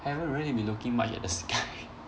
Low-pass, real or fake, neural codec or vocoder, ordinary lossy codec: none; real; none; none